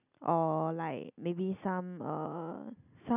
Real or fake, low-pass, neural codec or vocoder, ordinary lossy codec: real; 3.6 kHz; none; none